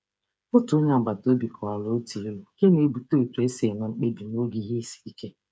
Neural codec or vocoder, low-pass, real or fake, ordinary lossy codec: codec, 16 kHz, 8 kbps, FreqCodec, smaller model; none; fake; none